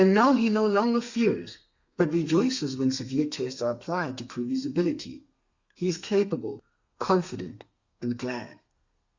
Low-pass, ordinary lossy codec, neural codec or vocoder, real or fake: 7.2 kHz; Opus, 64 kbps; codec, 32 kHz, 1.9 kbps, SNAC; fake